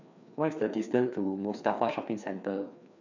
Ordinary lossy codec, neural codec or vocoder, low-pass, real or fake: none; codec, 16 kHz, 2 kbps, FreqCodec, larger model; 7.2 kHz; fake